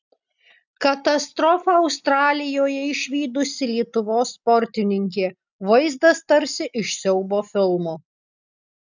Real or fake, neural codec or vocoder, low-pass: real; none; 7.2 kHz